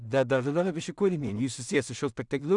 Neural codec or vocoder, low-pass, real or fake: codec, 16 kHz in and 24 kHz out, 0.4 kbps, LongCat-Audio-Codec, two codebook decoder; 10.8 kHz; fake